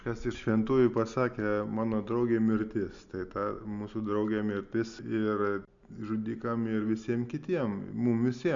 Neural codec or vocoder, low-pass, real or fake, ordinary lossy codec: none; 7.2 kHz; real; MP3, 64 kbps